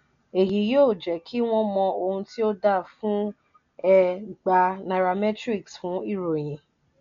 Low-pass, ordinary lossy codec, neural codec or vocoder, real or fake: 7.2 kHz; none; none; real